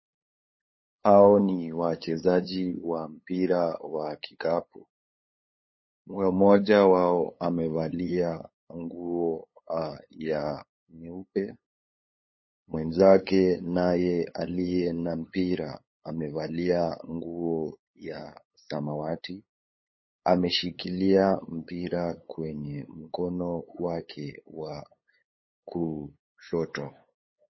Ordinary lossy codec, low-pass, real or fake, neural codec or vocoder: MP3, 24 kbps; 7.2 kHz; fake; codec, 16 kHz, 8 kbps, FunCodec, trained on LibriTTS, 25 frames a second